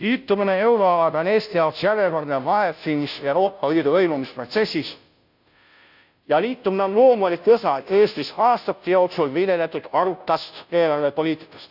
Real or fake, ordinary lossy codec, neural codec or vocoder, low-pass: fake; none; codec, 16 kHz, 0.5 kbps, FunCodec, trained on Chinese and English, 25 frames a second; 5.4 kHz